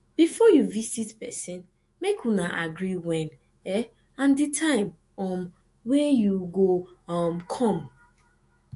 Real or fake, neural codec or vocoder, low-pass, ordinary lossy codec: fake; autoencoder, 48 kHz, 128 numbers a frame, DAC-VAE, trained on Japanese speech; 14.4 kHz; MP3, 48 kbps